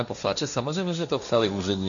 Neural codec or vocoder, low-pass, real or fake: codec, 16 kHz, 1.1 kbps, Voila-Tokenizer; 7.2 kHz; fake